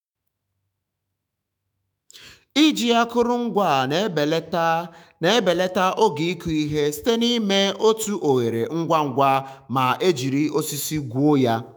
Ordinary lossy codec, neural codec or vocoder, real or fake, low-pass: none; autoencoder, 48 kHz, 128 numbers a frame, DAC-VAE, trained on Japanese speech; fake; none